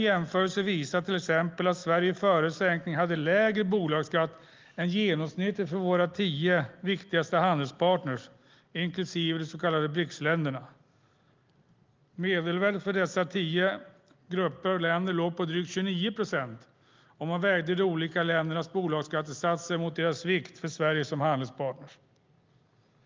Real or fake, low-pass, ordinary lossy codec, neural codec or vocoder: real; 7.2 kHz; Opus, 32 kbps; none